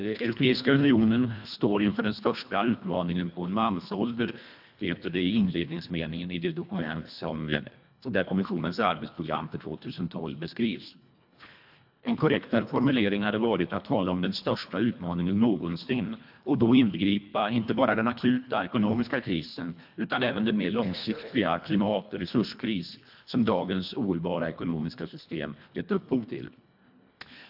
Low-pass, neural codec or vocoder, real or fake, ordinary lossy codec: 5.4 kHz; codec, 24 kHz, 1.5 kbps, HILCodec; fake; AAC, 48 kbps